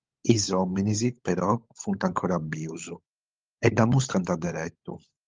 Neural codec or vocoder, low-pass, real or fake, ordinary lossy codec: codec, 16 kHz, 16 kbps, FunCodec, trained on LibriTTS, 50 frames a second; 7.2 kHz; fake; Opus, 32 kbps